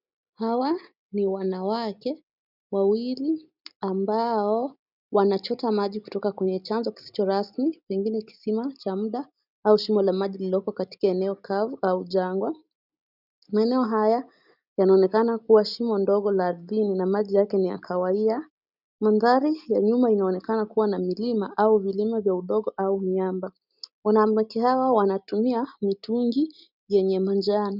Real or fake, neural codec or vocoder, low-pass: real; none; 5.4 kHz